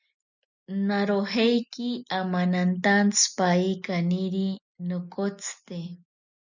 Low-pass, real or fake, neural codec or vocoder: 7.2 kHz; real; none